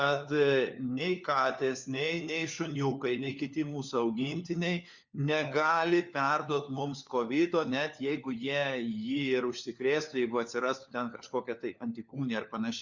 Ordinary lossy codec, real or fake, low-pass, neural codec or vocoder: Opus, 64 kbps; fake; 7.2 kHz; codec, 16 kHz, 4 kbps, FunCodec, trained on LibriTTS, 50 frames a second